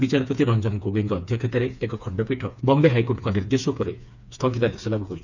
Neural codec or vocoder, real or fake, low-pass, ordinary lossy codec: codec, 16 kHz, 4 kbps, FreqCodec, smaller model; fake; 7.2 kHz; none